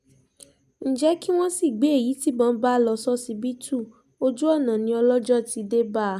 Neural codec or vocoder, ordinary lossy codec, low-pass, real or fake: none; none; 14.4 kHz; real